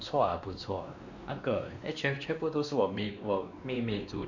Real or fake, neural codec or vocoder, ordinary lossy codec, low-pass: fake; codec, 16 kHz, 2 kbps, X-Codec, WavLM features, trained on Multilingual LibriSpeech; none; 7.2 kHz